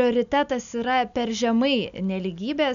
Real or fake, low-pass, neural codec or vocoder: real; 7.2 kHz; none